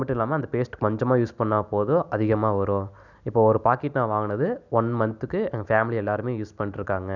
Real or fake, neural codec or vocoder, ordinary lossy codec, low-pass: real; none; none; 7.2 kHz